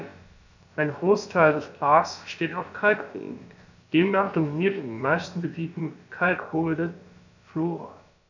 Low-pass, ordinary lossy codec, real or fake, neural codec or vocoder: 7.2 kHz; none; fake; codec, 16 kHz, about 1 kbps, DyCAST, with the encoder's durations